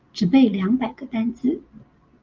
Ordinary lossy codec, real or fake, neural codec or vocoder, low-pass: Opus, 24 kbps; real; none; 7.2 kHz